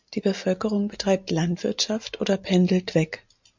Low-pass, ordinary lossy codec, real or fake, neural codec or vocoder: 7.2 kHz; MP3, 64 kbps; real; none